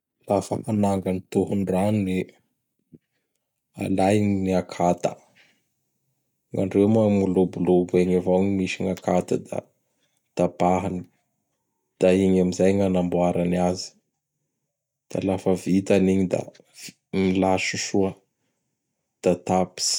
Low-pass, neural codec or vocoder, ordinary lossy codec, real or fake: 19.8 kHz; none; none; real